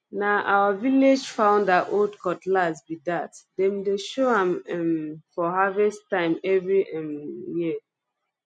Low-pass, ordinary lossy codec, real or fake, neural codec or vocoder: 9.9 kHz; none; real; none